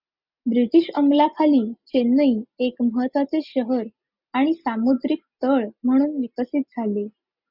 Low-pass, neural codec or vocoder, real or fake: 5.4 kHz; none; real